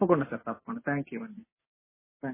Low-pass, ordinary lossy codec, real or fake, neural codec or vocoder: 3.6 kHz; MP3, 16 kbps; real; none